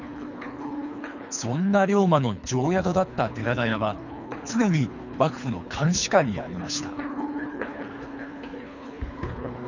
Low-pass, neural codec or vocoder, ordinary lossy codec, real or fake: 7.2 kHz; codec, 24 kHz, 3 kbps, HILCodec; none; fake